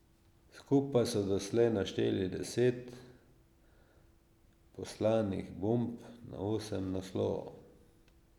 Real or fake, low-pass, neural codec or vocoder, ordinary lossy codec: real; 19.8 kHz; none; none